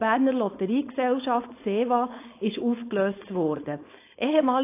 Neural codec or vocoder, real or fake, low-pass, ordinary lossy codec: codec, 16 kHz, 4.8 kbps, FACodec; fake; 3.6 kHz; AAC, 24 kbps